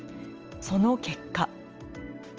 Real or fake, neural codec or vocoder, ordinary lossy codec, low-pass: real; none; Opus, 24 kbps; 7.2 kHz